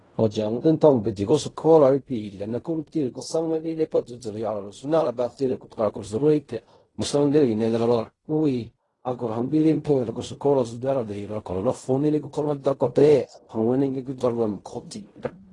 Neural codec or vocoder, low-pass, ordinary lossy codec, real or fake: codec, 16 kHz in and 24 kHz out, 0.4 kbps, LongCat-Audio-Codec, fine tuned four codebook decoder; 10.8 kHz; AAC, 32 kbps; fake